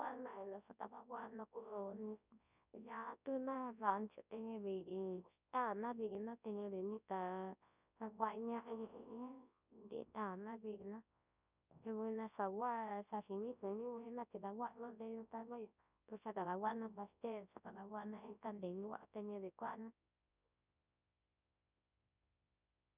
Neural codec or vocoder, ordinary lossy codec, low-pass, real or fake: codec, 24 kHz, 0.9 kbps, WavTokenizer, large speech release; none; 3.6 kHz; fake